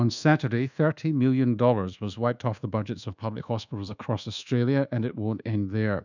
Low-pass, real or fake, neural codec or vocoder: 7.2 kHz; fake; codec, 24 kHz, 1.2 kbps, DualCodec